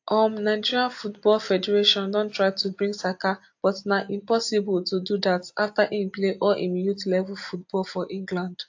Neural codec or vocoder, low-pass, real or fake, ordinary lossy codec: none; 7.2 kHz; real; AAC, 48 kbps